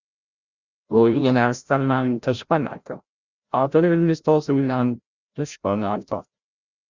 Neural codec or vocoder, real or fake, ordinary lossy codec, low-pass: codec, 16 kHz, 0.5 kbps, FreqCodec, larger model; fake; Opus, 64 kbps; 7.2 kHz